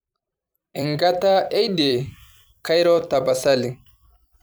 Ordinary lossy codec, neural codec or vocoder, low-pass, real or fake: none; vocoder, 44.1 kHz, 128 mel bands every 256 samples, BigVGAN v2; none; fake